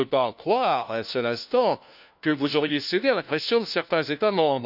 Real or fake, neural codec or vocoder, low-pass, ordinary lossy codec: fake; codec, 16 kHz, 1 kbps, FunCodec, trained on LibriTTS, 50 frames a second; 5.4 kHz; none